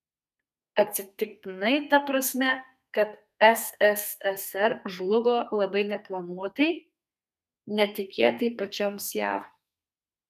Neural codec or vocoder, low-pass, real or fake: codec, 32 kHz, 1.9 kbps, SNAC; 14.4 kHz; fake